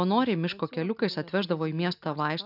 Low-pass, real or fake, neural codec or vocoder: 5.4 kHz; real; none